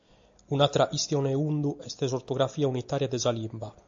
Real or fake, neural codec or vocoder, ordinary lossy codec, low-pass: real; none; AAC, 64 kbps; 7.2 kHz